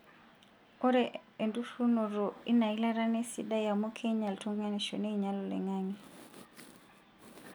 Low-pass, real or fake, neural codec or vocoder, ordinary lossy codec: none; real; none; none